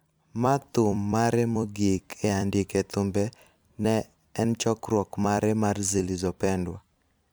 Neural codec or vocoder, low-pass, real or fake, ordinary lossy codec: vocoder, 44.1 kHz, 128 mel bands every 256 samples, BigVGAN v2; none; fake; none